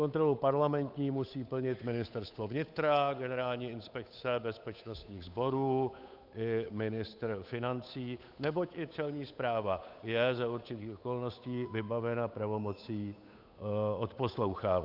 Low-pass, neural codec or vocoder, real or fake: 5.4 kHz; codec, 16 kHz, 8 kbps, FunCodec, trained on Chinese and English, 25 frames a second; fake